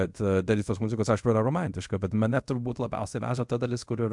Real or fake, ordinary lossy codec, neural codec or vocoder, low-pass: fake; MP3, 64 kbps; codec, 24 kHz, 0.5 kbps, DualCodec; 10.8 kHz